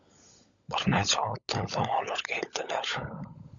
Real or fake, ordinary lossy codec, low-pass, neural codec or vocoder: fake; Opus, 64 kbps; 7.2 kHz; codec, 16 kHz, 16 kbps, FunCodec, trained on LibriTTS, 50 frames a second